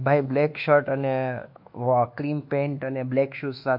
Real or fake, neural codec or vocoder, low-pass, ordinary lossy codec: fake; codec, 24 kHz, 1.2 kbps, DualCodec; 5.4 kHz; MP3, 48 kbps